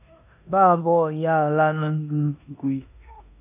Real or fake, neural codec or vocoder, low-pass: fake; codec, 16 kHz in and 24 kHz out, 0.9 kbps, LongCat-Audio-Codec, four codebook decoder; 3.6 kHz